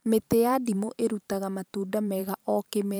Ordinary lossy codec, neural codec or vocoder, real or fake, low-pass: none; vocoder, 44.1 kHz, 128 mel bands every 512 samples, BigVGAN v2; fake; none